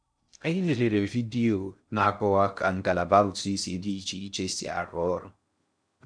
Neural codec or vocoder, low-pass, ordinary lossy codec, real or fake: codec, 16 kHz in and 24 kHz out, 0.6 kbps, FocalCodec, streaming, 2048 codes; 9.9 kHz; none; fake